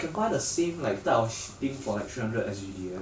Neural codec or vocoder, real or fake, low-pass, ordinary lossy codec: none; real; none; none